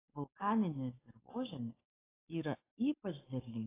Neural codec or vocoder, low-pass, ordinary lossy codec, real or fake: codec, 44.1 kHz, 7.8 kbps, Pupu-Codec; 3.6 kHz; AAC, 16 kbps; fake